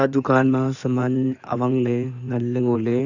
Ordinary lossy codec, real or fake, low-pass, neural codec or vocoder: none; fake; 7.2 kHz; codec, 16 kHz in and 24 kHz out, 2.2 kbps, FireRedTTS-2 codec